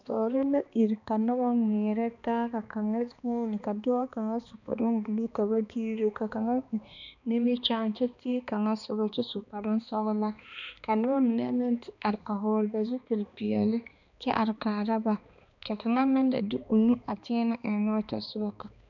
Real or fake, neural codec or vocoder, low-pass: fake; codec, 16 kHz, 2 kbps, X-Codec, HuBERT features, trained on balanced general audio; 7.2 kHz